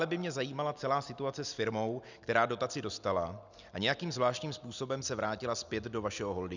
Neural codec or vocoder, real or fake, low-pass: none; real; 7.2 kHz